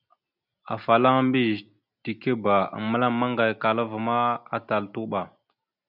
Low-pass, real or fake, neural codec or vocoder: 5.4 kHz; real; none